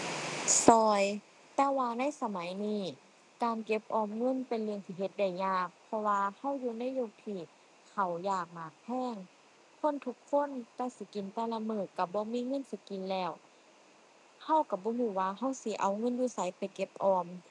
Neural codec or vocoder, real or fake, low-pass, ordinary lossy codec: none; real; 10.8 kHz; none